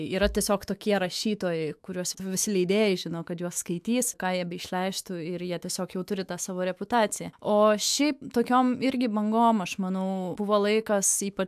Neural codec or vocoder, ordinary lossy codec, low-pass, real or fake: autoencoder, 48 kHz, 128 numbers a frame, DAC-VAE, trained on Japanese speech; AAC, 96 kbps; 14.4 kHz; fake